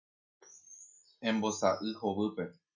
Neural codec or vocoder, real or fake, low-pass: none; real; 7.2 kHz